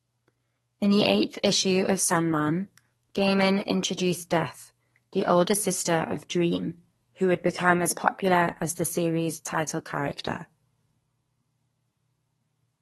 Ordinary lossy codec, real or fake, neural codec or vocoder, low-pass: AAC, 32 kbps; fake; codec, 32 kHz, 1.9 kbps, SNAC; 14.4 kHz